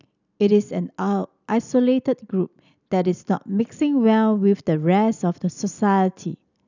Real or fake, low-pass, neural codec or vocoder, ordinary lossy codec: real; 7.2 kHz; none; none